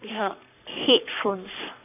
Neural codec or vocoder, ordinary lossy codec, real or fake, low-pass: codec, 44.1 kHz, 3.4 kbps, Pupu-Codec; none; fake; 3.6 kHz